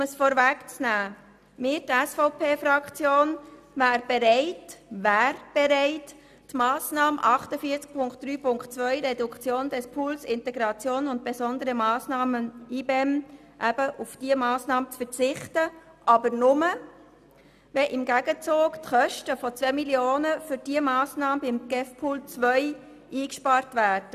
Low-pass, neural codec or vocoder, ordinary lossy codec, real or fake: 14.4 kHz; none; none; real